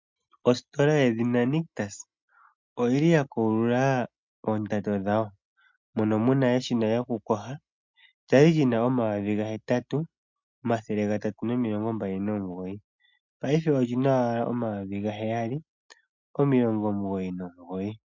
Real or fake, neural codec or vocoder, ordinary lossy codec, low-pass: real; none; MP3, 64 kbps; 7.2 kHz